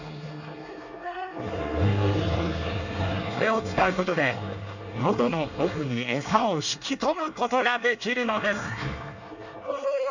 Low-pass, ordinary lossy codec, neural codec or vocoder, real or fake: 7.2 kHz; none; codec, 24 kHz, 1 kbps, SNAC; fake